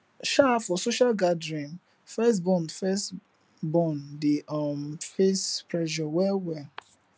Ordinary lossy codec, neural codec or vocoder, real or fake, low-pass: none; none; real; none